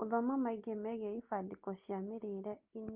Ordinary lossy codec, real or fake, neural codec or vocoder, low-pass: Opus, 32 kbps; real; none; 3.6 kHz